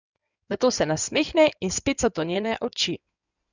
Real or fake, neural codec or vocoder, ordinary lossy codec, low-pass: fake; codec, 16 kHz in and 24 kHz out, 2.2 kbps, FireRedTTS-2 codec; none; 7.2 kHz